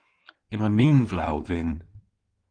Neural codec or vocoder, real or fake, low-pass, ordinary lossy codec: codec, 16 kHz in and 24 kHz out, 1.1 kbps, FireRedTTS-2 codec; fake; 9.9 kHz; Opus, 24 kbps